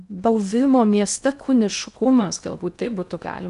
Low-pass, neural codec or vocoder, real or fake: 10.8 kHz; codec, 16 kHz in and 24 kHz out, 0.6 kbps, FocalCodec, streaming, 2048 codes; fake